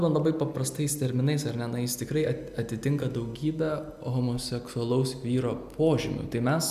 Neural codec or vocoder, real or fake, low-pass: none; real; 14.4 kHz